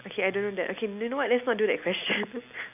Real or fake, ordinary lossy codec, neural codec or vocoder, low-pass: real; none; none; 3.6 kHz